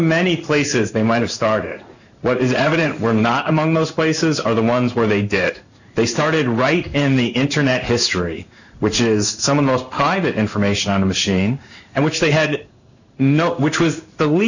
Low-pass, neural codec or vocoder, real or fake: 7.2 kHz; codec, 16 kHz in and 24 kHz out, 1 kbps, XY-Tokenizer; fake